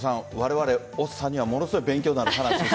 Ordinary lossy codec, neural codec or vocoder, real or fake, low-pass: none; none; real; none